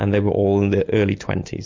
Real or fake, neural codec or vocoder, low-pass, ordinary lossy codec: real; none; 7.2 kHz; MP3, 48 kbps